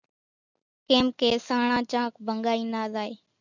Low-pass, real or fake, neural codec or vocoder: 7.2 kHz; real; none